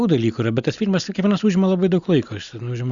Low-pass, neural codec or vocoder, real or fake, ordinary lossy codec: 7.2 kHz; none; real; Opus, 64 kbps